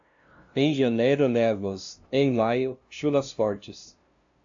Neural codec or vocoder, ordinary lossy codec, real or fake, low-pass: codec, 16 kHz, 0.5 kbps, FunCodec, trained on LibriTTS, 25 frames a second; AAC, 64 kbps; fake; 7.2 kHz